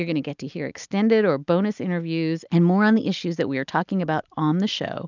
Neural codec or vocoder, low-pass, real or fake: none; 7.2 kHz; real